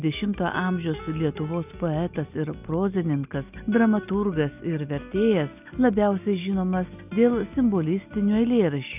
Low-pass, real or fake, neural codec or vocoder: 3.6 kHz; real; none